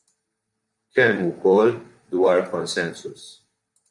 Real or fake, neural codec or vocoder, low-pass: fake; vocoder, 44.1 kHz, 128 mel bands, Pupu-Vocoder; 10.8 kHz